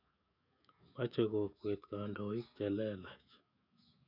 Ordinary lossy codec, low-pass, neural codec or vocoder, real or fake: none; 5.4 kHz; autoencoder, 48 kHz, 128 numbers a frame, DAC-VAE, trained on Japanese speech; fake